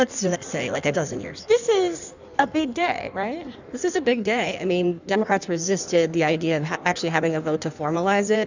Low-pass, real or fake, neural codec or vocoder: 7.2 kHz; fake; codec, 16 kHz in and 24 kHz out, 1.1 kbps, FireRedTTS-2 codec